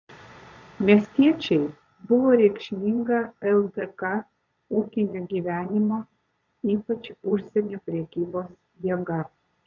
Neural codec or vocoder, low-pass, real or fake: vocoder, 22.05 kHz, 80 mel bands, Vocos; 7.2 kHz; fake